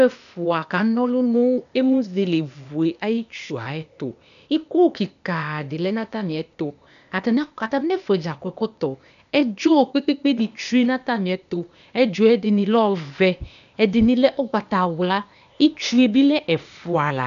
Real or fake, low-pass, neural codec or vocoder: fake; 7.2 kHz; codec, 16 kHz, 0.8 kbps, ZipCodec